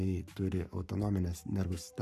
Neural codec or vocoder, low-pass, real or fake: codec, 44.1 kHz, 7.8 kbps, Pupu-Codec; 14.4 kHz; fake